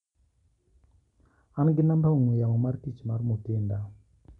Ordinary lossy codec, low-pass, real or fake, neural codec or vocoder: none; 10.8 kHz; real; none